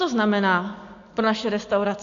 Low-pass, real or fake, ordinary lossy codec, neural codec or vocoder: 7.2 kHz; real; AAC, 48 kbps; none